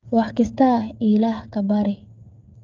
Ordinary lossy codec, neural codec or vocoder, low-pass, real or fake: Opus, 24 kbps; codec, 16 kHz, 8 kbps, FreqCodec, smaller model; 7.2 kHz; fake